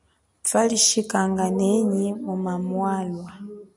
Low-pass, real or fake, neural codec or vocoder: 10.8 kHz; real; none